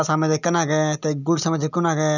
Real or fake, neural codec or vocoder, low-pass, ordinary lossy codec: real; none; 7.2 kHz; none